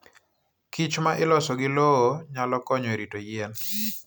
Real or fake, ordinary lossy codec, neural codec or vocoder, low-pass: real; none; none; none